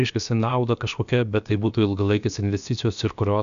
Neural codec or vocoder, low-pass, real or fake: codec, 16 kHz, 0.7 kbps, FocalCodec; 7.2 kHz; fake